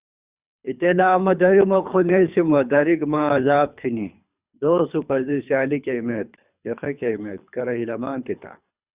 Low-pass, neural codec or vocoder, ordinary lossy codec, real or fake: 3.6 kHz; codec, 24 kHz, 3 kbps, HILCodec; Opus, 64 kbps; fake